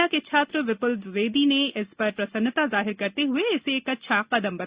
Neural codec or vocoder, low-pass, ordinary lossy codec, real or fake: none; 3.6 kHz; Opus, 64 kbps; real